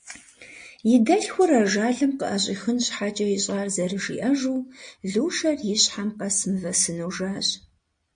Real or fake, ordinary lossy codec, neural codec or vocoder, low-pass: real; MP3, 48 kbps; none; 9.9 kHz